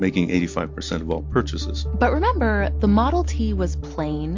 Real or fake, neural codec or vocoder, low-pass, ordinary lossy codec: real; none; 7.2 kHz; MP3, 48 kbps